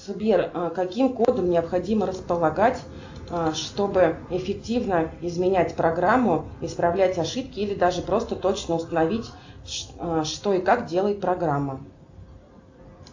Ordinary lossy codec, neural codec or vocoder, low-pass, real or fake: AAC, 48 kbps; none; 7.2 kHz; real